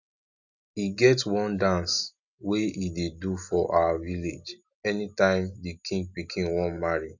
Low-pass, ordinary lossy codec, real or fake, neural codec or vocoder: 7.2 kHz; none; real; none